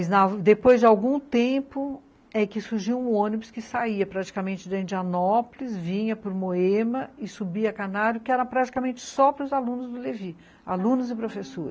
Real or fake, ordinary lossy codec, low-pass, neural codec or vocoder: real; none; none; none